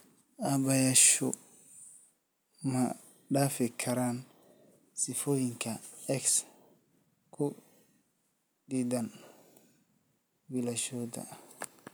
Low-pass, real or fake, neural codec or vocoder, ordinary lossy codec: none; real; none; none